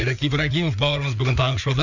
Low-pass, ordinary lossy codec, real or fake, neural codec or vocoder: 7.2 kHz; none; fake; codec, 16 kHz, 4 kbps, FreqCodec, larger model